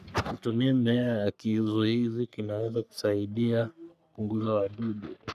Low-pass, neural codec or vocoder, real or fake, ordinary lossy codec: 14.4 kHz; codec, 44.1 kHz, 3.4 kbps, Pupu-Codec; fake; none